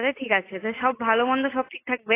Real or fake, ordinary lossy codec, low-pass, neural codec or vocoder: real; AAC, 16 kbps; 3.6 kHz; none